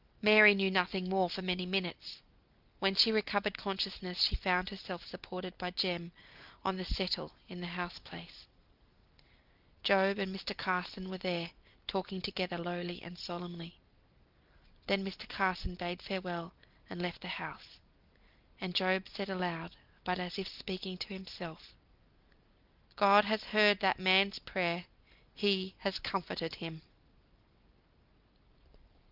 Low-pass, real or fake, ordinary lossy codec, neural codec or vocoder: 5.4 kHz; real; Opus, 32 kbps; none